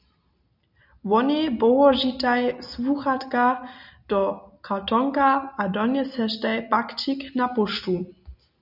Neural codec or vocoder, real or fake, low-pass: none; real; 5.4 kHz